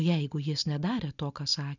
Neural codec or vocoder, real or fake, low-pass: none; real; 7.2 kHz